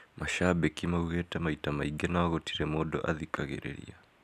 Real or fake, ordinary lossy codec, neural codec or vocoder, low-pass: real; none; none; 14.4 kHz